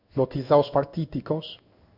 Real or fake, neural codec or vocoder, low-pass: fake; codec, 16 kHz in and 24 kHz out, 1 kbps, XY-Tokenizer; 5.4 kHz